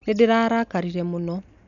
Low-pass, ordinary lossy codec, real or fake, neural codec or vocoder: 7.2 kHz; none; real; none